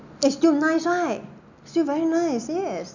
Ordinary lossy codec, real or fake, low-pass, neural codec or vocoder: none; real; 7.2 kHz; none